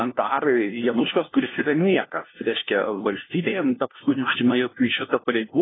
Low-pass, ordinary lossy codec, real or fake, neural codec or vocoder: 7.2 kHz; AAC, 16 kbps; fake; codec, 16 kHz, 1 kbps, FunCodec, trained on LibriTTS, 50 frames a second